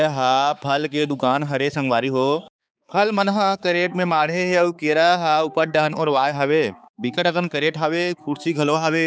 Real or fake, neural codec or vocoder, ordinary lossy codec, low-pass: fake; codec, 16 kHz, 4 kbps, X-Codec, HuBERT features, trained on balanced general audio; none; none